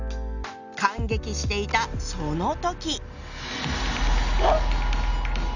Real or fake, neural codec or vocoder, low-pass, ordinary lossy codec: real; none; 7.2 kHz; none